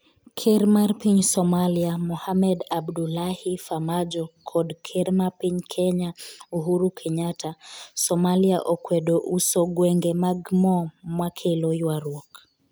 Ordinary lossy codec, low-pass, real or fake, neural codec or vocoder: none; none; real; none